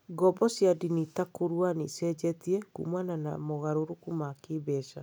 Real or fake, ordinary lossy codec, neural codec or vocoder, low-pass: real; none; none; none